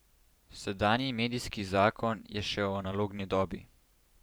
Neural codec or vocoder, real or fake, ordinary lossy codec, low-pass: vocoder, 44.1 kHz, 128 mel bands every 512 samples, BigVGAN v2; fake; none; none